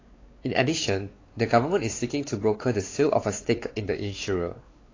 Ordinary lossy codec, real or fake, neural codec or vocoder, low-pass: AAC, 32 kbps; fake; codec, 16 kHz, 4 kbps, X-Codec, WavLM features, trained on Multilingual LibriSpeech; 7.2 kHz